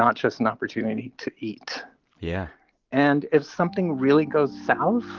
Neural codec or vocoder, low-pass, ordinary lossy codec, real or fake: none; 7.2 kHz; Opus, 32 kbps; real